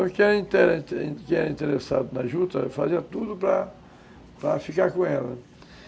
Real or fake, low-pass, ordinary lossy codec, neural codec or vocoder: real; none; none; none